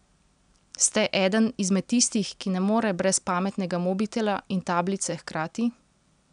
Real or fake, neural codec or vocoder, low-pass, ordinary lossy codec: real; none; 9.9 kHz; none